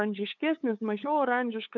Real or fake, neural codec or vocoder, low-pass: fake; codec, 16 kHz, 8 kbps, FunCodec, trained on LibriTTS, 25 frames a second; 7.2 kHz